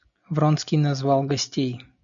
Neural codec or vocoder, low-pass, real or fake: none; 7.2 kHz; real